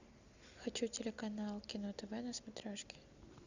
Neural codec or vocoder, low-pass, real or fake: none; 7.2 kHz; real